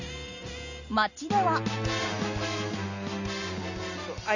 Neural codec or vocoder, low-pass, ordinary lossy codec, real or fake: none; 7.2 kHz; none; real